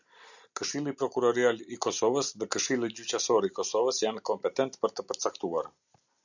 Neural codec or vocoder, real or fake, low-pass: none; real; 7.2 kHz